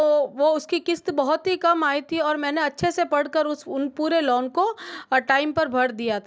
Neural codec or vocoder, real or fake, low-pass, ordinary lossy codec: none; real; none; none